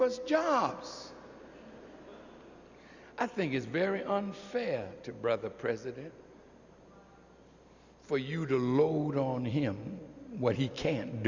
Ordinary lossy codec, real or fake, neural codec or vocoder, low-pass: Opus, 64 kbps; real; none; 7.2 kHz